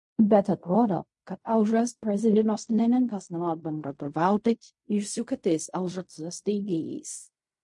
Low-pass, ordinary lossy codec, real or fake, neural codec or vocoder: 10.8 kHz; MP3, 48 kbps; fake; codec, 16 kHz in and 24 kHz out, 0.4 kbps, LongCat-Audio-Codec, fine tuned four codebook decoder